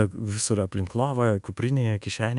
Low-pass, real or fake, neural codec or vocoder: 10.8 kHz; fake; codec, 24 kHz, 1.2 kbps, DualCodec